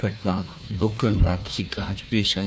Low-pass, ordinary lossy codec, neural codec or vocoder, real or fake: none; none; codec, 16 kHz, 1 kbps, FunCodec, trained on Chinese and English, 50 frames a second; fake